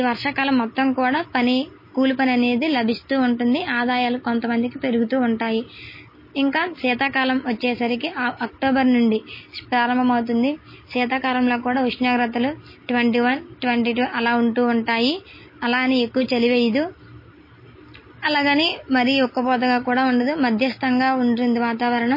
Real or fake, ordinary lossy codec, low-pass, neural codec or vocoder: real; MP3, 24 kbps; 5.4 kHz; none